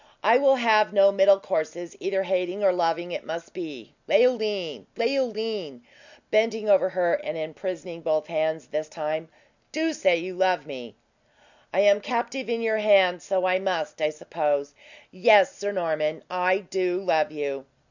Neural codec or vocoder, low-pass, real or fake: none; 7.2 kHz; real